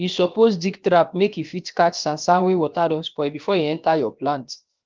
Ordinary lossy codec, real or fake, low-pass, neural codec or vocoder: Opus, 32 kbps; fake; 7.2 kHz; codec, 16 kHz, about 1 kbps, DyCAST, with the encoder's durations